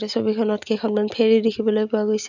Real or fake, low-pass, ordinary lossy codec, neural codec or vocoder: real; 7.2 kHz; none; none